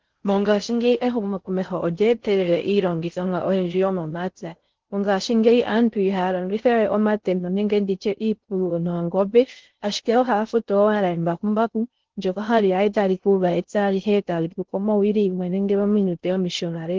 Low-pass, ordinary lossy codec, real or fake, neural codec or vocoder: 7.2 kHz; Opus, 16 kbps; fake; codec, 16 kHz in and 24 kHz out, 0.6 kbps, FocalCodec, streaming, 4096 codes